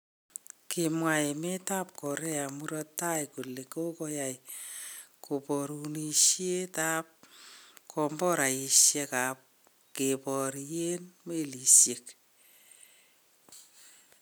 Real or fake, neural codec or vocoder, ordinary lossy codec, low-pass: real; none; none; none